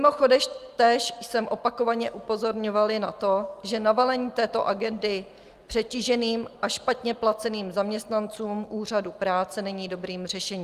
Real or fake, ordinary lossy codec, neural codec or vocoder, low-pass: real; Opus, 32 kbps; none; 14.4 kHz